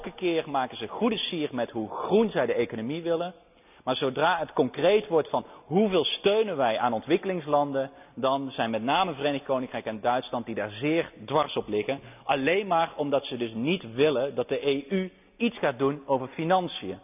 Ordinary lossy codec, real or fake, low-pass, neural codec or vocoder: none; real; 3.6 kHz; none